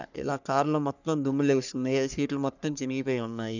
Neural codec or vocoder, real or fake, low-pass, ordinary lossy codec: codec, 44.1 kHz, 3.4 kbps, Pupu-Codec; fake; 7.2 kHz; none